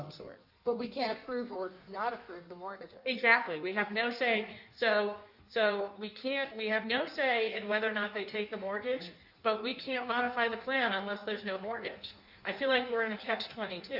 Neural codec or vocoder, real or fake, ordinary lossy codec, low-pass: codec, 16 kHz in and 24 kHz out, 1.1 kbps, FireRedTTS-2 codec; fake; Opus, 64 kbps; 5.4 kHz